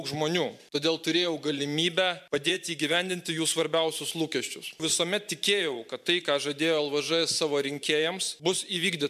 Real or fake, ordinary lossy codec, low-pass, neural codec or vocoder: fake; MP3, 96 kbps; 14.4 kHz; vocoder, 44.1 kHz, 128 mel bands every 512 samples, BigVGAN v2